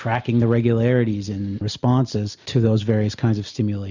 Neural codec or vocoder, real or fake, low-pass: none; real; 7.2 kHz